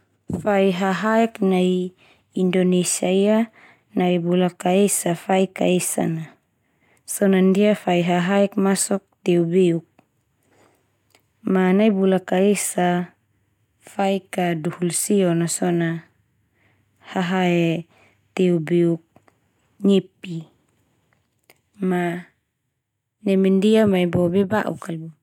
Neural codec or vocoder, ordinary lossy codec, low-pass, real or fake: none; none; 19.8 kHz; real